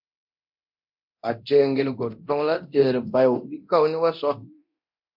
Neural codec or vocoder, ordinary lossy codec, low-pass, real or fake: codec, 24 kHz, 0.9 kbps, DualCodec; MP3, 48 kbps; 5.4 kHz; fake